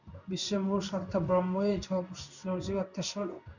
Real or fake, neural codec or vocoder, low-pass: fake; codec, 16 kHz in and 24 kHz out, 1 kbps, XY-Tokenizer; 7.2 kHz